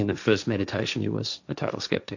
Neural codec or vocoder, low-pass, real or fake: codec, 16 kHz, 1.1 kbps, Voila-Tokenizer; 7.2 kHz; fake